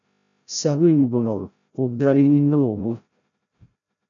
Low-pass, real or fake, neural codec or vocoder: 7.2 kHz; fake; codec, 16 kHz, 0.5 kbps, FreqCodec, larger model